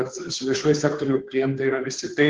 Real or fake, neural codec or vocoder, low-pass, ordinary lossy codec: fake; codec, 16 kHz, 2 kbps, FunCodec, trained on Chinese and English, 25 frames a second; 7.2 kHz; Opus, 16 kbps